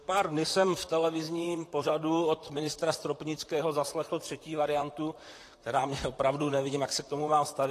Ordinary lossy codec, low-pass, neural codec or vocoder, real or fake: AAC, 48 kbps; 14.4 kHz; vocoder, 44.1 kHz, 128 mel bands, Pupu-Vocoder; fake